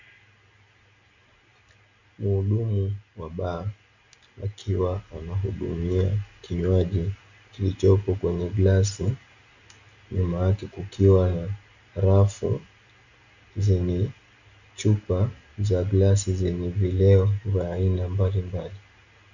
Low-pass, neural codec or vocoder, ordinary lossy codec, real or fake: 7.2 kHz; none; Opus, 64 kbps; real